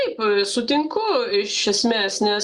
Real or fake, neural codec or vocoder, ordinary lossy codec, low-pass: real; none; Opus, 64 kbps; 10.8 kHz